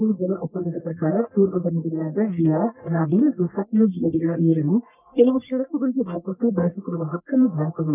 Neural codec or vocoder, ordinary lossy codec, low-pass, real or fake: codec, 44.1 kHz, 1.7 kbps, Pupu-Codec; none; 3.6 kHz; fake